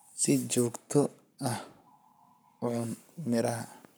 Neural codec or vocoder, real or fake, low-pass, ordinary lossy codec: codec, 44.1 kHz, 7.8 kbps, Pupu-Codec; fake; none; none